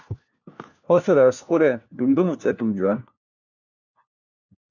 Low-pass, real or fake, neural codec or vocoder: 7.2 kHz; fake; codec, 16 kHz, 1 kbps, FunCodec, trained on LibriTTS, 50 frames a second